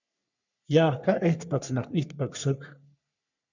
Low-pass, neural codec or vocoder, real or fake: 7.2 kHz; codec, 44.1 kHz, 3.4 kbps, Pupu-Codec; fake